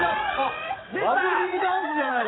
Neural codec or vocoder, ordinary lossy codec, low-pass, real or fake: vocoder, 22.05 kHz, 80 mel bands, WaveNeXt; AAC, 16 kbps; 7.2 kHz; fake